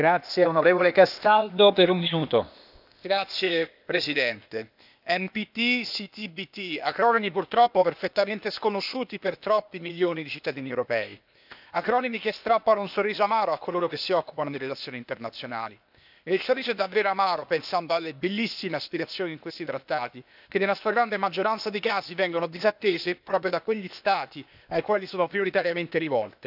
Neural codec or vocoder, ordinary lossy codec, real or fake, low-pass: codec, 16 kHz, 0.8 kbps, ZipCodec; none; fake; 5.4 kHz